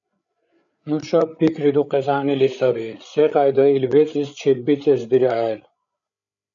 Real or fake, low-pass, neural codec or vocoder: fake; 7.2 kHz; codec, 16 kHz, 8 kbps, FreqCodec, larger model